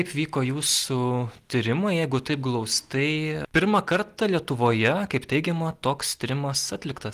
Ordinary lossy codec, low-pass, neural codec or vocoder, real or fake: Opus, 24 kbps; 14.4 kHz; none; real